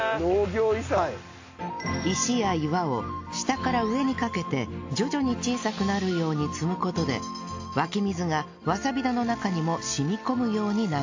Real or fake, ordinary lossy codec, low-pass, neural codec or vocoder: real; AAC, 48 kbps; 7.2 kHz; none